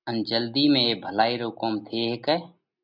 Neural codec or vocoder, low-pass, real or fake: none; 5.4 kHz; real